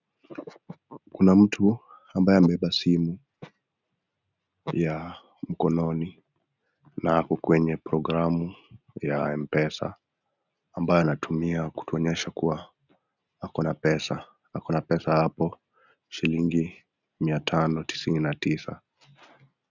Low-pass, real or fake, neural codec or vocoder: 7.2 kHz; real; none